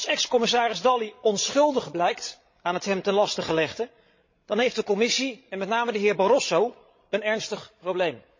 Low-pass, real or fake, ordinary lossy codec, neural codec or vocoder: 7.2 kHz; fake; MP3, 32 kbps; codec, 16 kHz, 16 kbps, FreqCodec, larger model